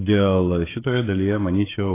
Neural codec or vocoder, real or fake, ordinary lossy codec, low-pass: codec, 16 kHz, 16 kbps, FreqCodec, smaller model; fake; AAC, 16 kbps; 3.6 kHz